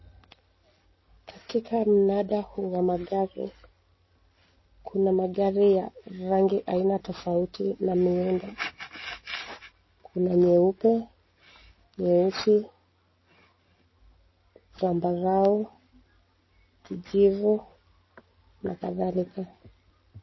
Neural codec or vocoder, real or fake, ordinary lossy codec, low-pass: none; real; MP3, 24 kbps; 7.2 kHz